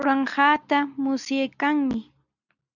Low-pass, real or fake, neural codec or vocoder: 7.2 kHz; real; none